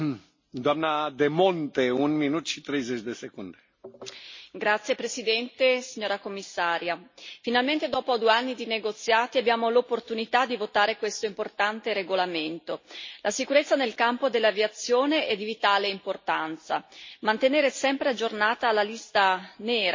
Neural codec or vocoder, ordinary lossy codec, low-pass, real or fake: none; MP3, 32 kbps; 7.2 kHz; real